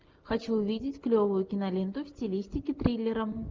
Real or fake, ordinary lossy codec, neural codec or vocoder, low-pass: real; Opus, 24 kbps; none; 7.2 kHz